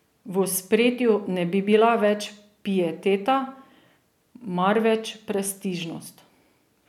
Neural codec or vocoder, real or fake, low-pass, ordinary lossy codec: none; real; 19.8 kHz; none